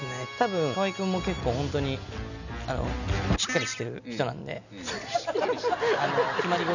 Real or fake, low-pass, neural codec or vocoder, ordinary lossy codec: real; 7.2 kHz; none; none